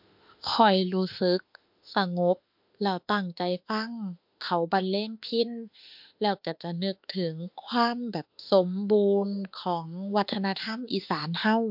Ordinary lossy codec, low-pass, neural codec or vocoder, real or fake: MP3, 48 kbps; 5.4 kHz; autoencoder, 48 kHz, 32 numbers a frame, DAC-VAE, trained on Japanese speech; fake